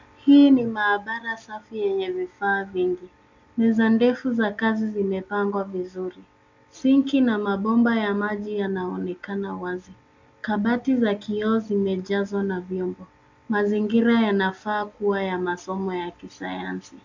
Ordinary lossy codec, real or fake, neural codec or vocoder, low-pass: MP3, 64 kbps; real; none; 7.2 kHz